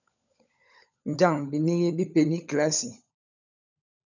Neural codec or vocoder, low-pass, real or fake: codec, 16 kHz, 16 kbps, FunCodec, trained on LibriTTS, 50 frames a second; 7.2 kHz; fake